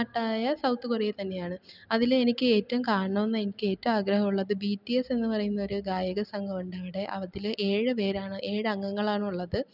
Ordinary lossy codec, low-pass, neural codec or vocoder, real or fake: none; 5.4 kHz; none; real